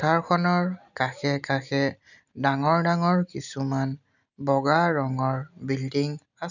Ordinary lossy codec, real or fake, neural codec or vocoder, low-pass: none; real; none; 7.2 kHz